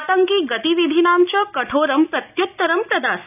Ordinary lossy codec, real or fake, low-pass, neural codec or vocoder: none; real; 3.6 kHz; none